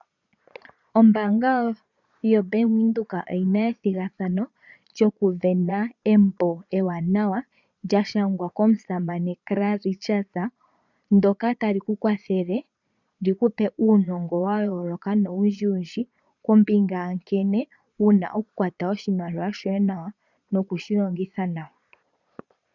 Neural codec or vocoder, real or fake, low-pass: vocoder, 22.05 kHz, 80 mel bands, Vocos; fake; 7.2 kHz